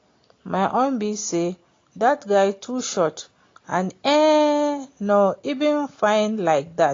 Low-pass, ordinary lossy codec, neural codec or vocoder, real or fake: 7.2 kHz; AAC, 32 kbps; none; real